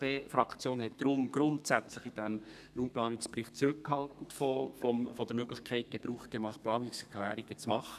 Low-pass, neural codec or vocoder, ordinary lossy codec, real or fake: 14.4 kHz; codec, 32 kHz, 1.9 kbps, SNAC; none; fake